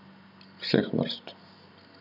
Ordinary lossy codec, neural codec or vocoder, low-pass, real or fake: none; none; 5.4 kHz; real